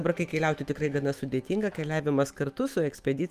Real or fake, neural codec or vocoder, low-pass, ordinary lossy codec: fake; autoencoder, 48 kHz, 128 numbers a frame, DAC-VAE, trained on Japanese speech; 14.4 kHz; Opus, 32 kbps